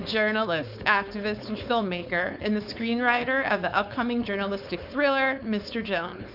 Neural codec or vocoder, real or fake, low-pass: codec, 16 kHz, 4.8 kbps, FACodec; fake; 5.4 kHz